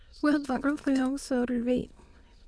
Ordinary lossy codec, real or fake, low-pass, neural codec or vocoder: none; fake; none; autoencoder, 22.05 kHz, a latent of 192 numbers a frame, VITS, trained on many speakers